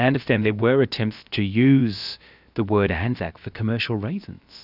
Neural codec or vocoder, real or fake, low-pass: codec, 16 kHz, about 1 kbps, DyCAST, with the encoder's durations; fake; 5.4 kHz